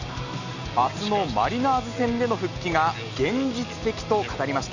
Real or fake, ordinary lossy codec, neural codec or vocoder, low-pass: real; none; none; 7.2 kHz